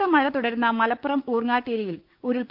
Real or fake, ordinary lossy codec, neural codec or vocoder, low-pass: fake; Opus, 32 kbps; codec, 16 kHz, 6 kbps, DAC; 5.4 kHz